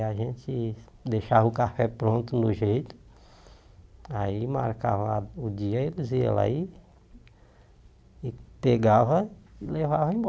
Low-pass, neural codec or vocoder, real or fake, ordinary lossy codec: none; none; real; none